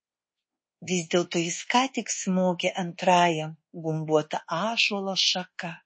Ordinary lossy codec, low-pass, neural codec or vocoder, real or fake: MP3, 32 kbps; 9.9 kHz; codec, 24 kHz, 1.2 kbps, DualCodec; fake